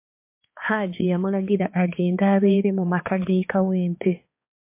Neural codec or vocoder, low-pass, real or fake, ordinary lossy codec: codec, 16 kHz, 4 kbps, X-Codec, HuBERT features, trained on balanced general audio; 3.6 kHz; fake; MP3, 24 kbps